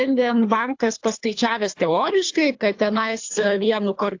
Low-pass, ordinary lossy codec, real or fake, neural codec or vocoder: 7.2 kHz; AAC, 48 kbps; fake; codec, 24 kHz, 3 kbps, HILCodec